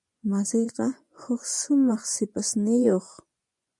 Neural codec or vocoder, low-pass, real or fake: vocoder, 24 kHz, 100 mel bands, Vocos; 10.8 kHz; fake